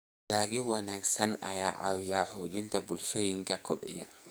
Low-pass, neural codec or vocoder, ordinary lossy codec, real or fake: none; codec, 44.1 kHz, 2.6 kbps, SNAC; none; fake